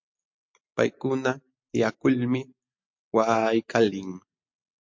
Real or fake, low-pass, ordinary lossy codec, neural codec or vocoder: real; 7.2 kHz; MP3, 48 kbps; none